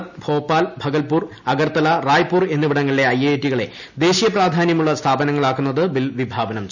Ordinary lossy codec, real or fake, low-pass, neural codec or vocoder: none; real; 7.2 kHz; none